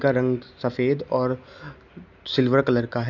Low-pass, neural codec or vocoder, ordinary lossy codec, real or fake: 7.2 kHz; none; none; real